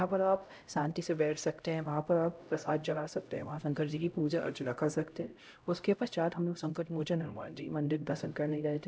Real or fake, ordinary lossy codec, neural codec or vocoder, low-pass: fake; none; codec, 16 kHz, 0.5 kbps, X-Codec, HuBERT features, trained on LibriSpeech; none